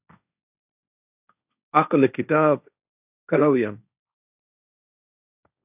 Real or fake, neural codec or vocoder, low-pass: fake; codec, 16 kHz, 1.1 kbps, Voila-Tokenizer; 3.6 kHz